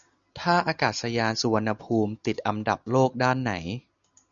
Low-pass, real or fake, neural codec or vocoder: 7.2 kHz; real; none